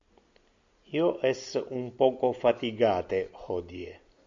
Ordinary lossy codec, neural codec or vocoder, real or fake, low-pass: AAC, 64 kbps; none; real; 7.2 kHz